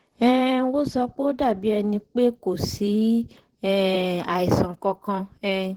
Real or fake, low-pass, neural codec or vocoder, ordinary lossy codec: fake; 19.8 kHz; vocoder, 44.1 kHz, 128 mel bands, Pupu-Vocoder; Opus, 16 kbps